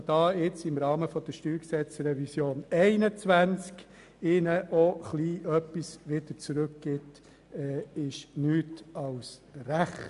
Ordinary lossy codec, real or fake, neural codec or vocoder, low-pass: AAC, 96 kbps; real; none; 10.8 kHz